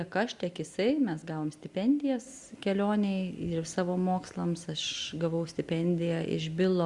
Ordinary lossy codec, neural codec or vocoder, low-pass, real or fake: Opus, 64 kbps; none; 10.8 kHz; real